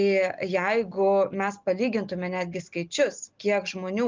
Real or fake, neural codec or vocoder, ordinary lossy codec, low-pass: real; none; Opus, 24 kbps; 7.2 kHz